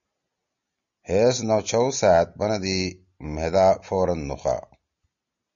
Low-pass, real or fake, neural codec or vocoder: 7.2 kHz; real; none